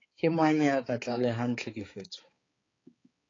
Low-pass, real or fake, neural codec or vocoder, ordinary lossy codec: 7.2 kHz; fake; codec, 16 kHz, 4 kbps, X-Codec, HuBERT features, trained on balanced general audio; AAC, 32 kbps